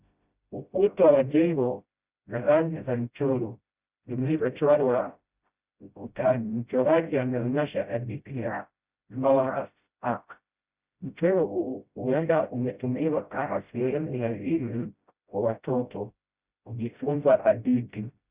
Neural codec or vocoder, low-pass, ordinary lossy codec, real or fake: codec, 16 kHz, 0.5 kbps, FreqCodec, smaller model; 3.6 kHz; Opus, 64 kbps; fake